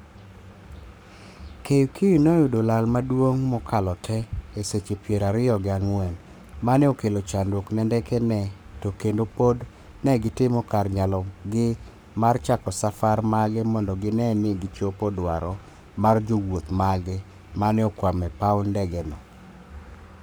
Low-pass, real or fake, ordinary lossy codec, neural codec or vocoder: none; fake; none; codec, 44.1 kHz, 7.8 kbps, Pupu-Codec